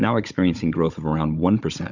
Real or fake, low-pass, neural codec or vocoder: fake; 7.2 kHz; codec, 16 kHz, 16 kbps, FunCodec, trained on Chinese and English, 50 frames a second